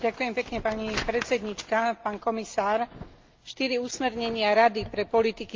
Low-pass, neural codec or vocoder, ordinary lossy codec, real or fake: 7.2 kHz; none; Opus, 32 kbps; real